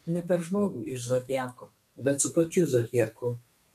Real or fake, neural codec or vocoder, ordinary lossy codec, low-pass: fake; codec, 32 kHz, 1.9 kbps, SNAC; MP3, 96 kbps; 14.4 kHz